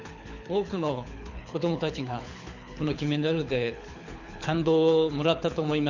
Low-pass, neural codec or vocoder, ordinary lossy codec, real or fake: 7.2 kHz; codec, 24 kHz, 6 kbps, HILCodec; Opus, 64 kbps; fake